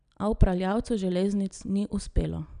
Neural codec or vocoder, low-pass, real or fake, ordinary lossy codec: none; 9.9 kHz; real; none